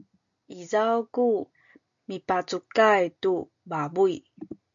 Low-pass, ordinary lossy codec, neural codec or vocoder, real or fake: 7.2 kHz; MP3, 64 kbps; none; real